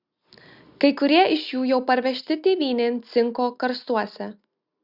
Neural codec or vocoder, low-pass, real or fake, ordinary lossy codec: none; 5.4 kHz; real; Opus, 64 kbps